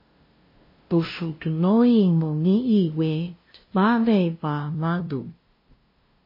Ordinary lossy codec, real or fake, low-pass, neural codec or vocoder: MP3, 24 kbps; fake; 5.4 kHz; codec, 16 kHz, 0.5 kbps, FunCodec, trained on LibriTTS, 25 frames a second